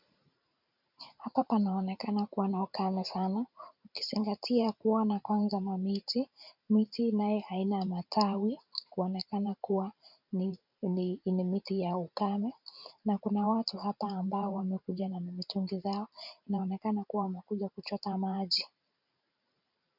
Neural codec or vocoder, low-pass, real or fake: vocoder, 44.1 kHz, 128 mel bands every 512 samples, BigVGAN v2; 5.4 kHz; fake